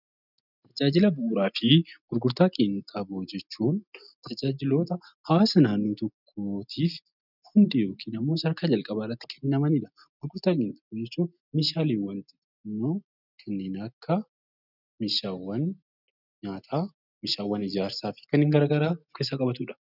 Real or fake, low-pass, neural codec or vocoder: real; 5.4 kHz; none